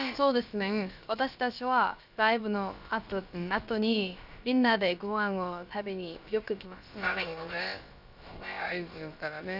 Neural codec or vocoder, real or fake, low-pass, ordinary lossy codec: codec, 16 kHz, about 1 kbps, DyCAST, with the encoder's durations; fake; 5.4 kHz; none